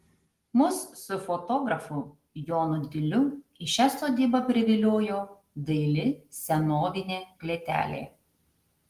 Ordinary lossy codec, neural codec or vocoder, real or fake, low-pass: Opus, 24 kbps; none; real; 14.4 kHz